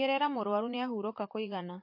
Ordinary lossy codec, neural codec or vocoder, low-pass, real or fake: MP3, 32 kbps; vocoder, 44.1 kHz, 80 mel bands, Vocos; 5.4 kHz; fake